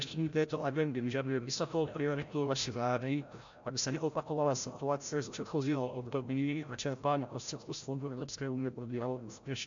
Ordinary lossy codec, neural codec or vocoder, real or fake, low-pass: MP3, 64 kbps; codec, 16 kHz, 0.5 kbps, FreqCodec, larger model; fake; 7.2 kHz